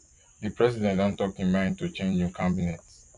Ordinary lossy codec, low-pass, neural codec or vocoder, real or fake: none; 10.8 kHz; vocoder, 24 kHz, 100 mel bands, Vocos; fake